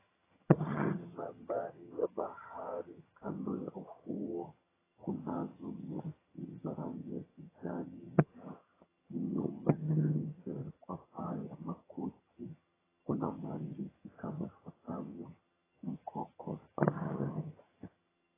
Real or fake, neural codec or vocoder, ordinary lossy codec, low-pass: fake; vocoder, 22.05 kHz, 80 mel bands, HiFi-GAN; AAC, 16 kbps; 3.6 kHz